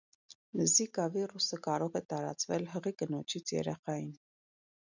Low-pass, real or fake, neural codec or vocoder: 7.2 kHz; real; none